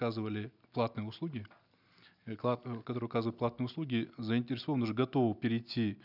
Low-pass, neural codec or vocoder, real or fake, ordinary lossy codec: 5.4 kHz; none; real; none